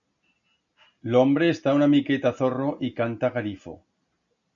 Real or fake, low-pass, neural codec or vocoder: real; 7.2 kHz; none